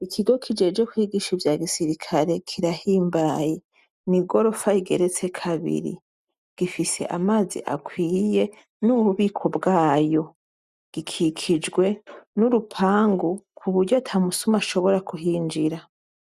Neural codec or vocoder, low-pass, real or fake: none; 14.4 kHz; real